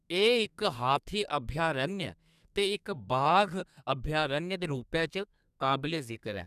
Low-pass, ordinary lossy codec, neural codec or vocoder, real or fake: 14.4 kHz; none; codec, 32 kHz, 1.9 kbps, SNAC; fake